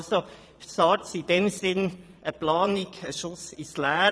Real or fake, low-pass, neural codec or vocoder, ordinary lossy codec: fake; none; vocoder, 22.05 kHz, 80 mel bands, Vocos; none